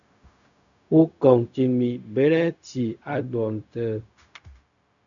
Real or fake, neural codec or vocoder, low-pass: fake; codec, 16 kHz, 0.4 kbps, LongCat-Audio-Codec; 7.2 kHz